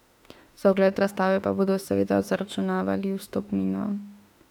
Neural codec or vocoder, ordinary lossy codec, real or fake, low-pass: autoencoder, 48 kHz, 32 numbers a frame, DAC-VAE, trained on Japanese speech; none; fake; 19.8 kHz